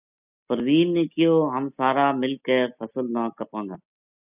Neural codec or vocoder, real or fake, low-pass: none; real; 3.6 kHz